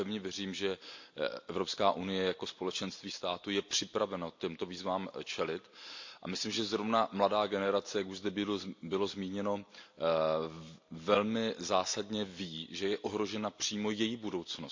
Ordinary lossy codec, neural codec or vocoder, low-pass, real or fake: MP3, 48 kbps; none; 7.2 kHz; real